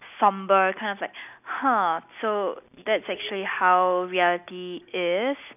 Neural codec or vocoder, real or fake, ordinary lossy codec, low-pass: none; real; none; 3.6 kHz